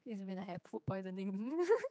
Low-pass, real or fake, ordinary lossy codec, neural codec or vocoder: none; fake; none; codec, 16 kHz, 4 kbps, X-Codec, HuBERT features, trained on general audio